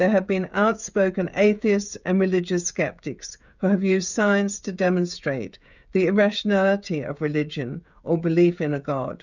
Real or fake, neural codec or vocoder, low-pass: fake; codec, 16 kHz, 16 kbps, FreqCodec, smaller model; 7.2 kHz